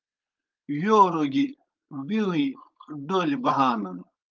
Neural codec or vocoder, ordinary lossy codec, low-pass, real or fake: codec, 16 kHz, 4.8 kbps, FACodec; Opus, 24 kbps; 7.2 kHz; fake